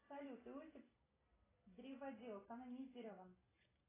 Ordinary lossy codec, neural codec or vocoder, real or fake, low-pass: AAC, 16 kbps; none; real; 3.6 kHz